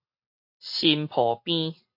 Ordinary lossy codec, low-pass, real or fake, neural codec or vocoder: MP3, 32 kbps; 5.4 kHz; real; none